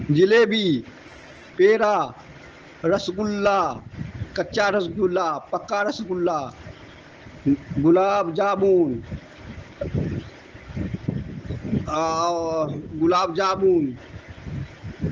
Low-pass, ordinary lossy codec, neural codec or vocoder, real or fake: 7.2 kHz; Opus, 16 kbps; none; real